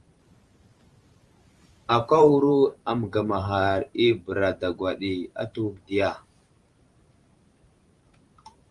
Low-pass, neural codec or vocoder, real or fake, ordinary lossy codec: 10.8 kHz; vocoder, 44.1 kHz, 128 mel bands every 512 samples, BigVGAN v2; fake; Opus, 32 kbps